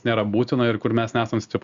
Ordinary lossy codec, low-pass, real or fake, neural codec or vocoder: Opus, 64 kbps; 7.2 kHz; real; none